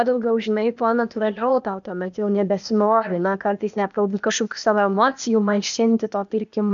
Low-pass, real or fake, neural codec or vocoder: 7.2 kHz; fake; codec, 16 kHz, 0.8 kbps, ZipCodec